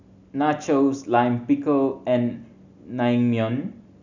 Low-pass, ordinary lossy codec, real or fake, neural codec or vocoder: 7.2 kHz; none; real; none